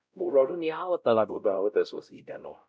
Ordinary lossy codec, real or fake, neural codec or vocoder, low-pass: none; fake; codec, 16 kHz, 0.5 kbps, X-Codec, WavLM features, trained on Multilingual LibriSpeech; none